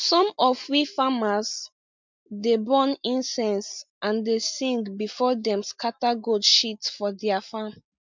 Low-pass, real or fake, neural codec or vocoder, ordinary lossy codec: 7.2 kHz; real; none; MP3, 64 kbps